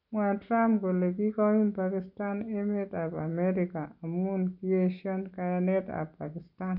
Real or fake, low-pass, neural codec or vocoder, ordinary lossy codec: real; 5.4 kHz; none; none